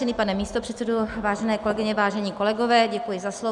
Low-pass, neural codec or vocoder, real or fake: 10.8 kHz; none; real